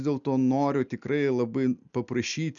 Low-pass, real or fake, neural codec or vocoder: 7.2 kHz; real; none